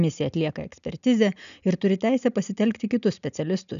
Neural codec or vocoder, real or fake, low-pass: none; real; 7.2 kHz